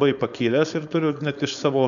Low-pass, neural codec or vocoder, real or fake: 7.2 kHz; codec, 16 kHz, 4.8 kbps, FACodec; fake